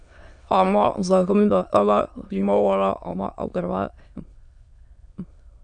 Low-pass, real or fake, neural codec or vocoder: 9.9 kHz; fake; autoencoder, 22.05 kHz, a latent of 192 numbers a frame, VITS, trained on many speakers